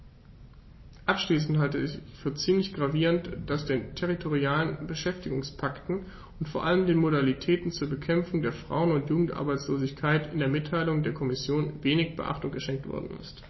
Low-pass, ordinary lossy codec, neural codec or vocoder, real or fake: 7.2 kHz; MP3, 24 kbps; none; real